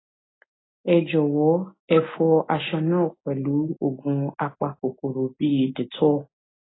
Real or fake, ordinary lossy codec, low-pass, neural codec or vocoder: real; AAC, 16 kbps; 7.2 kHz; none